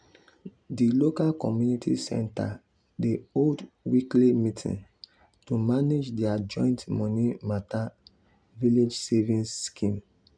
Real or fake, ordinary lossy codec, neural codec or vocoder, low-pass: real; none; none; 9.9 kHz